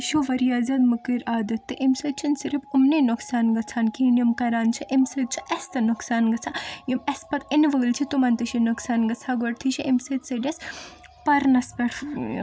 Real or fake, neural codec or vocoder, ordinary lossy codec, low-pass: real; none; none; none